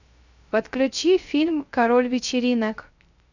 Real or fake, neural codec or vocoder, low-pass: fake; codec, 16 kHz, 0.3 kbps, FocalCodec; 7.2 kHz